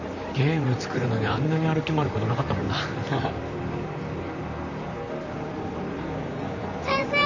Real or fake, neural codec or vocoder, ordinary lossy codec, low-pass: fake; vocoder, 44.1 kHz, 128 mel bands, Pupu-Vocoder; none; 7.2 kHz